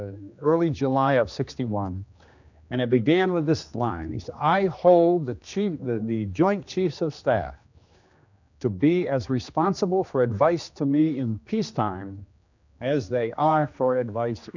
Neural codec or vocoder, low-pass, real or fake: codec, 16 kHz, 2 kbps, X-Codec, HuBERT features, trained on general audio; 7.2 kHz; fake